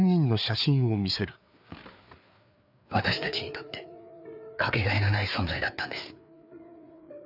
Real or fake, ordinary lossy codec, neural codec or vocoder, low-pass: fake; none; codec, 16 kHz, 4 kbps, FreqCodec, larger model; 5.4 kHz